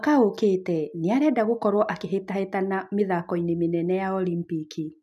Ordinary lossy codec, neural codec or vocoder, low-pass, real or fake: none; none; 14.4 kHz; real